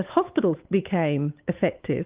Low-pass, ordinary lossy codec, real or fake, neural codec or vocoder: 3.6 kHz; Opus, 32 kbps; fake; codec, 16 kHz, 8 kbps, FunCodec, trained on Chinese and English, 25 frames a second